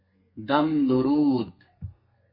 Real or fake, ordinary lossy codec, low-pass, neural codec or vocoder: fake; MP3, 24 kbps; 5.4 kHz; codec, 32 kHz, 1.9 kbps, SNAC